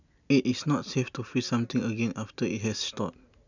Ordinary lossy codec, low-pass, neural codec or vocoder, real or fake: none; 7.2 kHz; none; real